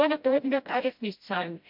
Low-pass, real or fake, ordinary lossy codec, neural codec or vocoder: 5.4 kHz; fake; MP3, 48 kbps; codec, 16 kHz, 0.5 kbps, FreqCodec, smaller model